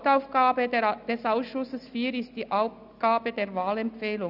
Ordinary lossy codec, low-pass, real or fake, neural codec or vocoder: none; 5.4 kHz; real; none